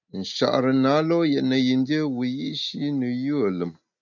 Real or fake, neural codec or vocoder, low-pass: real; none; 7.2 kHz